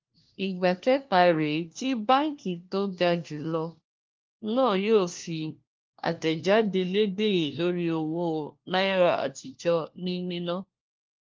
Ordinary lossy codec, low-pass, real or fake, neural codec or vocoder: Opus, 32 kbps; 7.2 kHz; fake; codec, 16 kHz, 1 kbps, FunCodec, trained on LibriTTS, 50 frames a second